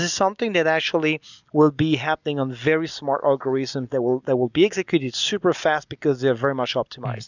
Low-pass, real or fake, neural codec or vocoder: 7.2 kHz; real; none